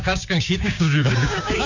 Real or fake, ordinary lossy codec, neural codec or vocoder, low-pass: fake; none; codec, 16 kHz, 6 kbps, DAC; 7.2 kHz